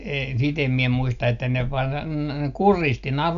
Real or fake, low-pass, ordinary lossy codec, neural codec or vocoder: real; 7.2 kHz; none; none